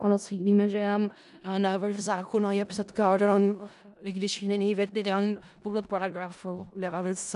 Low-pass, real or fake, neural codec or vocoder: 10.8 kHz; fake; codec, 16 kHz in and 24 kHz out, 0.4 kbps, LongCat-Audio-Codec, four codebook decoder